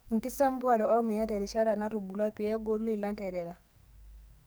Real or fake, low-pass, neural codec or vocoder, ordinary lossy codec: fake; none; codec, 44.1 kHz, 2.6 kbps, SNAC; none